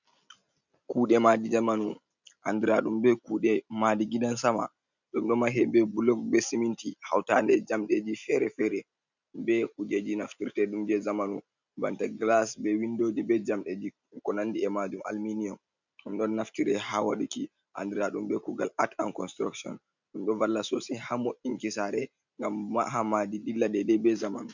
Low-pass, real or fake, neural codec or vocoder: 7.2 kHz; real; none